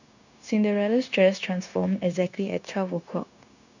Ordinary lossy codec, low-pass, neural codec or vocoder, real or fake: none; 7.2 kHz; codec, 16 kHz, 0.9 kbps, LongCat-Audio-Codec; fake